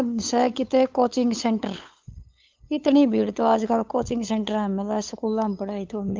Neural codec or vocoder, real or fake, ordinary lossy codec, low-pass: codec, 16 kHz, 8 kbps, FunCodec, trained on LibriTTS, 25 frames a second; fake; Opus, 24 kbps; 7.2 kHz